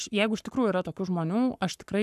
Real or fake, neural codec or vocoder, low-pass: fake; codec, 44.1 kHz, 7.8 kbps, Pupu-Codec; 14.4 kHz